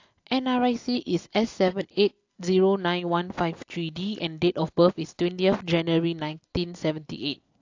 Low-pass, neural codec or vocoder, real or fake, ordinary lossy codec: 7.2 kHz; none; real; AAC, 48 kbps